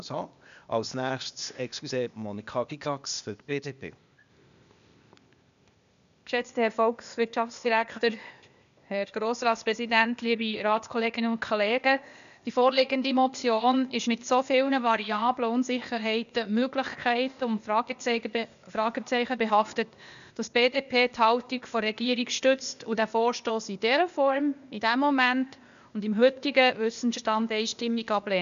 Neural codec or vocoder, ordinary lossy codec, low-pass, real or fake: codec, 16 kHz, 0.8 kbps, ZipCodec; none; 7.2 kHz; fake